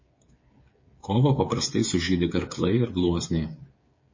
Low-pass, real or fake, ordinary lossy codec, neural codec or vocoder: 7.2 kHz; fake; MP3, 32 kbps; codec, 16 kHz, 8 kbps, FreqCodec, smaller model